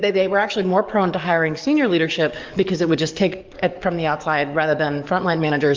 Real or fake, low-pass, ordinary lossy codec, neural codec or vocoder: fake; 7.2 kHz; Opus, 24 kbps; codec, 44.1 kHz, 7.8 kbps, DAC